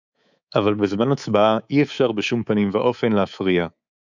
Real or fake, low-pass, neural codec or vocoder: fake; 7.2 kHz; codec, 24 kHz, 3.1 kbps, DualCodec